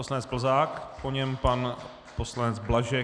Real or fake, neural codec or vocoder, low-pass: real; none; 9.9 kHz